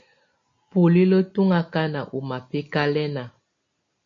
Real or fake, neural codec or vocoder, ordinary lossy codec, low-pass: real; none; AAC, 48 kbps; 7.2 kHz